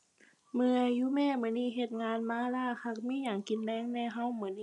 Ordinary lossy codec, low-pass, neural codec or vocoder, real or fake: none; 10.8 kHz; none; real